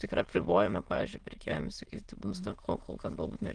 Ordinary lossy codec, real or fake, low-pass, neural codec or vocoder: Opus, 16 kbps; fake; 9.9 kHz; autoencoder, 22.05 kHz, a latent of 192 numbers a frame, VITS, trained on many speakers